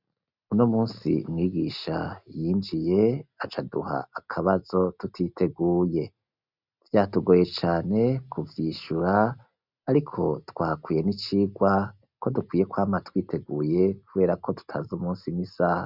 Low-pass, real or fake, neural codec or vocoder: 5.4 kHz; real; none